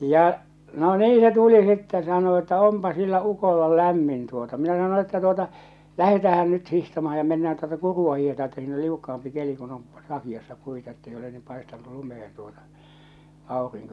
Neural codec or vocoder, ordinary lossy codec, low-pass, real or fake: none; none; none; real